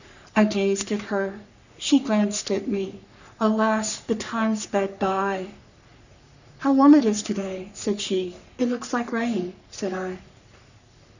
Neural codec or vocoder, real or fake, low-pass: codec, 44.1 kHz, 3.4 kbps, Pupu-Codec; fake; 7.2 kHz